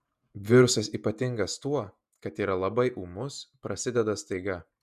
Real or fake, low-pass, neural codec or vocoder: fake; 14.4 kHz; vocoder, 48 kHz, 128 mel bands, Vocos